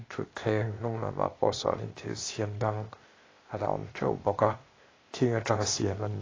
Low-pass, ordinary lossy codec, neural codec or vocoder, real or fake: 7.2 kHz; AAC, 32 kbps; codec, 16 kHz, 0.8 kbps, ZipCodec; fake